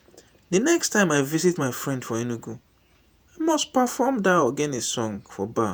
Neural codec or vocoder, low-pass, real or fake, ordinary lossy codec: vocoder, 48 kHz, 128 mel bands, Vocos; none; fake; none